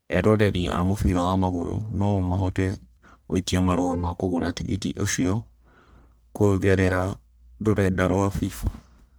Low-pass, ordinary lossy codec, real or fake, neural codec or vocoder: none; none; fake; codec, 44.1 kHz, 1.7 kbps, Pupu-Codec